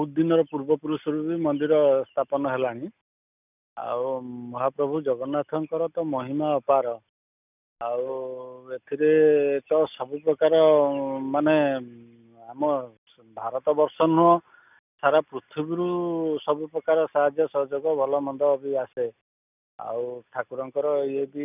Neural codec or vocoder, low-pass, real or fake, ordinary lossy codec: none; 3.6 kHz; real; none